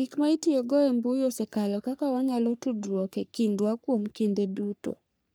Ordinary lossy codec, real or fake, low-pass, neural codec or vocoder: none; fake; none; codec, 44.1 kHz, 3.4 kbps, Pupu-Codec